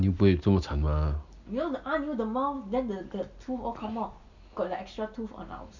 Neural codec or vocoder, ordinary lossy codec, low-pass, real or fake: none; none; 7.2 kHz; real